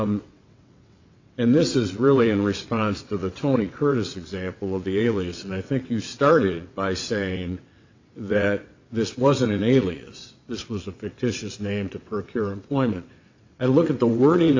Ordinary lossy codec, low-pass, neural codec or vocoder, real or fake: AAC, 48 kbps; 7.2 kHz; vocoder, 22.05 kHz, 80 mel bands, WaveNeXt; fake